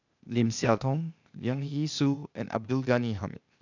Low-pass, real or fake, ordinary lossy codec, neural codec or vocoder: 7.2 kHz; fake; none; codec, 16 kHz, 0.8 kbps, ZipCodec